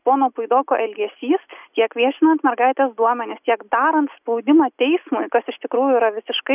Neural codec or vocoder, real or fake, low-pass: none; real; 3.6 kHz